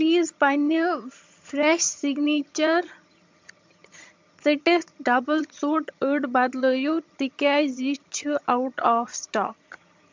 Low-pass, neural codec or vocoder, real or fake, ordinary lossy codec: 7.2 kHz; vocoder, 22.05 kHz, 80 mel bands, HiFi-GAN; fake; MP3, 64 kbps